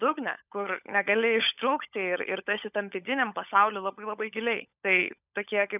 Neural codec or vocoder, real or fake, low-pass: codec, 16 kHz, 16 kbps, FunCodec, trained on LibriTTS, 50 frames a second; fake; 3.6 kHz